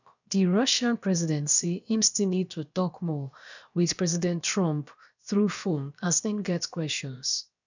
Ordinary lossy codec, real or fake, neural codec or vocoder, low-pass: none; fake; codec, 16 kHz, about 1 kbps, DyCAST, with the encoder's durations; 7.2 kHz